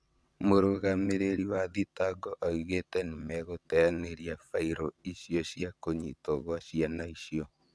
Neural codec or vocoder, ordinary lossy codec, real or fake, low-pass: vocoder, 22.05 kHz, 80 mel bands, WaveNeXt; none; fake; none